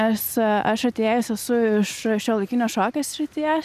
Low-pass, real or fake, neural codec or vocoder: 14.4 kHz; real; none